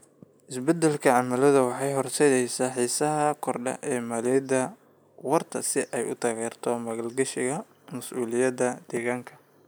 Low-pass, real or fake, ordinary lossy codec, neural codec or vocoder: none; real; none; none